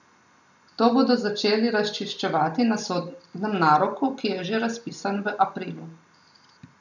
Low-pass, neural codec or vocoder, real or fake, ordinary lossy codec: 7.2 kHz; none; real; none